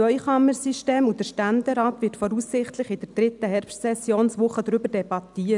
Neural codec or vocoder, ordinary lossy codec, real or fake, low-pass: none; none; real; 10.8 kHz